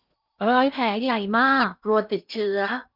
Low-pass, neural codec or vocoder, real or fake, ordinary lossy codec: 5.4 kHz; codec, 16 kHz in and 24 kHz out, 0.8 kbps, FocalCodec, streaming, 65536 codes; fake; none